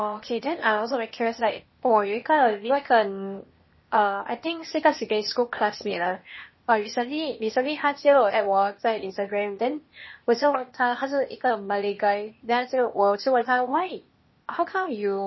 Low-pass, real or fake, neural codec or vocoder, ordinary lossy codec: 7.2 kHz; fake; codec, 16 kHz, 0.8 kbps, ZipCodec; MP3, 24 kbps